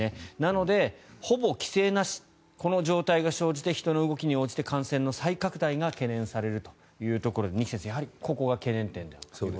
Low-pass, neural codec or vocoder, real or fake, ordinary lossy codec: none; none; real; none